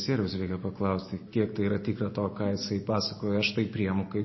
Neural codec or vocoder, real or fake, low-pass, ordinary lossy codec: none; real; 7.2 kHz; MP3, 24 kbps